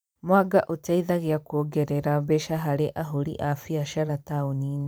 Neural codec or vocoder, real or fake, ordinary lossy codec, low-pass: vocoder, 44.1 kHz, 128 mel bands every 512 samples, BigVGAN v2; fake; none; none